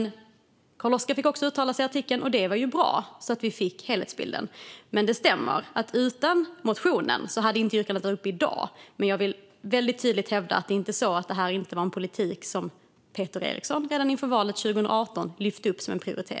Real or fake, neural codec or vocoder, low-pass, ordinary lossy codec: real; none; none; none